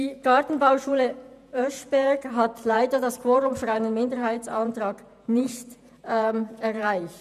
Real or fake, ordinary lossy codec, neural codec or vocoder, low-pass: fake; none; vocoder, 48 kHz, 128 mel bands, Vocos; 14.4 kHz